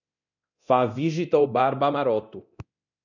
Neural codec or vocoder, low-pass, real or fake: codec, 24 kHz, 0.9 kbps, DualCodec; 7.2 kHz; fake